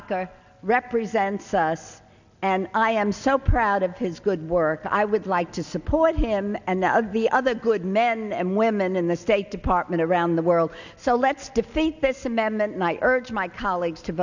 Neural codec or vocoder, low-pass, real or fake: none; 7.2 kHz; real